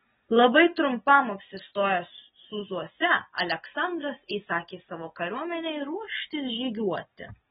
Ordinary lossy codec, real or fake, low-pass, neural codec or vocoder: AAC, 16 kbps; real; 19.8 kHz; none